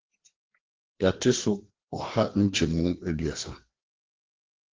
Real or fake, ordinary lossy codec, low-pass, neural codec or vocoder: fake; Opus, 16 kbps; 7.2 kHz; codec, 16 kHz, 2 kbps, FreqCodec, larger model